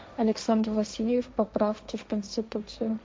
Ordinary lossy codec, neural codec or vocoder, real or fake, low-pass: none; codec, 16 kHz, 1.1 kbps, Voila-Tokenizer; fake; none